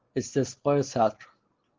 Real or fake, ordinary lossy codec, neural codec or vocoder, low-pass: real; Opus, 16 kbps; none; 7.2 kHz